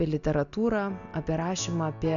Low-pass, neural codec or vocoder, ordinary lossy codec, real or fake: 7.2 kHz; none; MP3, 64 kbps; real